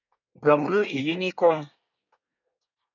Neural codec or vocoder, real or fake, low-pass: codec, 24 kHz, 1 kbps, SNAC; fake; 7.2 kHz